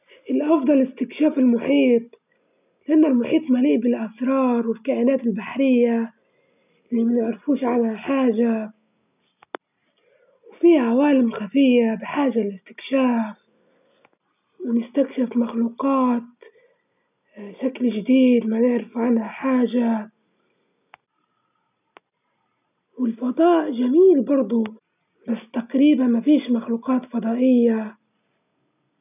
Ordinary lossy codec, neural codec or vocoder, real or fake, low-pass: none; none; real; 3.6 kHz